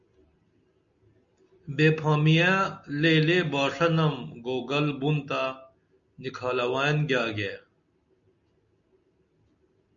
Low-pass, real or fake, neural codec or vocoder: 7.2 kHz; real; none